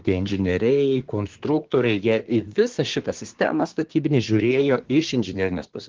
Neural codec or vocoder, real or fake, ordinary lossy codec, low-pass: codec, 24 kHz, 1 kbps, SNAC; fake; Opus, 16 kbps; 7.2 kHz